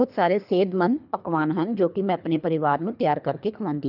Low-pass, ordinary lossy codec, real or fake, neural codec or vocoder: 5.4 kHz; none; fake; codec, 24 kHz, 3 kbps, HILCodec